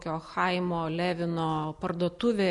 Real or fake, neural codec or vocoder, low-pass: real; none; 10.8 kHz